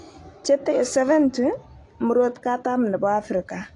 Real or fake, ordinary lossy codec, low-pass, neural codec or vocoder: real; AAC, 48 kbps; 10.8 kHz; none